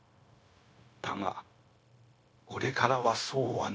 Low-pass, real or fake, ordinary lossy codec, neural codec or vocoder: none; fake; none; codec, 16 kHz, 0.9 kbps, LongCat-Audio-Codec